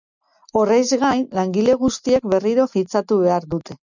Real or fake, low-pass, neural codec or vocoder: real; 7.2 kHz; none